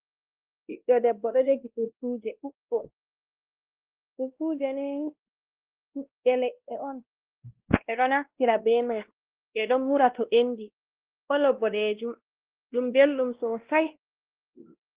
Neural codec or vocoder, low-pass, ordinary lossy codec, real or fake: codec, 16 kHz, 1 kbps, X-Codec, WavLM features, trained on Multilingual LibriSpeech; 3.6 kHz; Opus, 16 kbps; fake